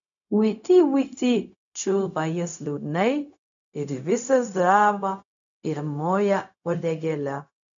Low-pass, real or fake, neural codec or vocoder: 7.2 kHz; fake; codec, 16 kHz, 0.4 kbps, LongCat-Audio-Codec